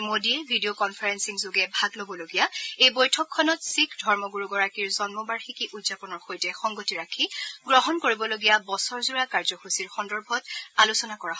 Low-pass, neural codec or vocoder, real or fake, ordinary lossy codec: none; none; real; none